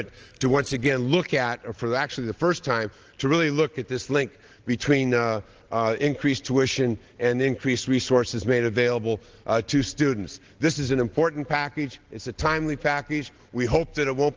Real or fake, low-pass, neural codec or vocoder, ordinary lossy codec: real; 7.2 kHz; none; Opus, 16 kbps